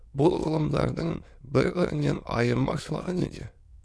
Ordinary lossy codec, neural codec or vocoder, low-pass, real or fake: none; autoencoder, 22.05 kHz, a latent of 192 numbers a frame, VITS, trained on many speakers; none; fake